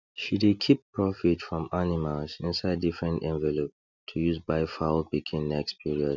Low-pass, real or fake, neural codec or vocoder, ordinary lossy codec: 7.2 kHz; real; none; none